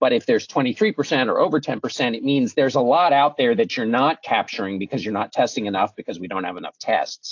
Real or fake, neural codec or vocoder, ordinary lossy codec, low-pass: real; none; AAC, 48 kbps; 7.2 kHz